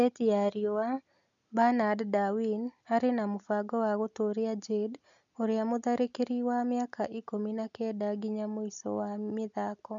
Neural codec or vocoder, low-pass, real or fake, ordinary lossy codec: none; 7.2 kHz; real; none